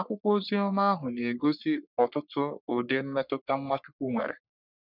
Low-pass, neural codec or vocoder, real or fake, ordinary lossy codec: 5.4 kHz; codec, 44.1 kHz, 3.4 kbps, Pupu-Codec; fake; none